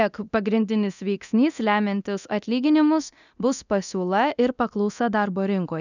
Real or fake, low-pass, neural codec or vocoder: fake; 7.2 kHz; codec, 24 kHz, 0.9 kbps, DualCodec